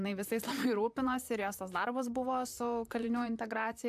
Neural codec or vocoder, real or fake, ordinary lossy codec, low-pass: vocoder, 44.1 kHz, 128 mel bands, Pupu-Vocoder; fake; AAC, 96 kbps; 14.4 kHz